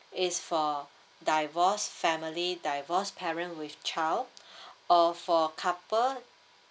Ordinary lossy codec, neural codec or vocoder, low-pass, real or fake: none; none; none; real